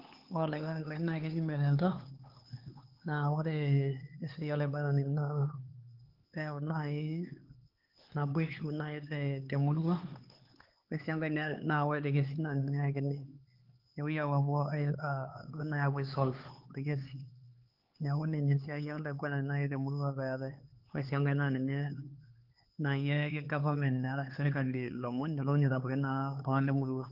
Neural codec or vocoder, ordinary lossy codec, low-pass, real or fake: codec, 16 kHz, 4 kbps, X-Codec, HuBERT features, trained on LibriSpeech; Opus, 16 kbps; 5.4 kHz; fake